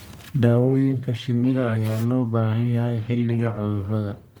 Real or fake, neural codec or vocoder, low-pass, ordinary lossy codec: fake; codec, 44.1 kHz, 1.7 kbps, Pupu-Codec; none; none